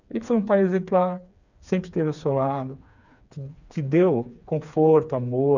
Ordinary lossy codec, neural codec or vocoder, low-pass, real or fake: none; codec, 16 kHz, 4 kbps, FreqCodec, smaller model; 7.2 kHz; fake